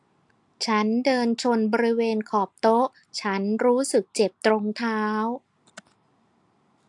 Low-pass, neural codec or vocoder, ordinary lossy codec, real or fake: 10.8 kHz; none; AAC, 64 kbps; real